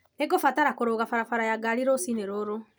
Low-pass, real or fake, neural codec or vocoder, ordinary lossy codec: none; real; none; none